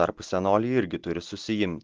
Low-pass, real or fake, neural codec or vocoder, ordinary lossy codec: 7.2 kHz; real; none; Opus, 16 kbps